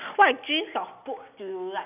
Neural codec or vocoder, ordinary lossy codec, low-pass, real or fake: none; none; 3.6 kHz; real